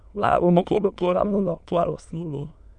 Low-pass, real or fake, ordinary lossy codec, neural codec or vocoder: 9.9 kHz; fake; none; autoencoder, 22.05 kHz, a latent of 192 numbers a frame, VITS, trained on many speakers